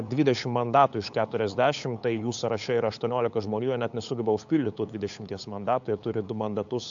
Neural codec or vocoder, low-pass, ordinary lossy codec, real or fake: codec, 16 kHz, 8 kbps, FunCodec, trained on LibriTTS, 25 frames a second; 7.2 kHz; AAC, 64 kbps; fake